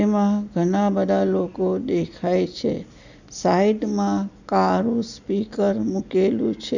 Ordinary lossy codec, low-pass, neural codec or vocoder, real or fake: none; 7.2 kHz; none; real